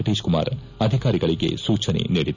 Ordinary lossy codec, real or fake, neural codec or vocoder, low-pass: none; real; none; 7.2 kHz